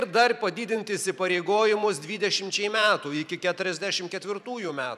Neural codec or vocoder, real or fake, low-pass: vocoder, 44.1 kHz, 128 mel bands every 512 samples, BigVGAN v2; fake; 14.4 kHz